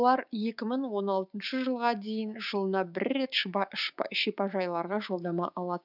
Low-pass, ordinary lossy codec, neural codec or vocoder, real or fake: 5.4 kHz; none; codec, 16 kHz, 6 kbps, DAC; fake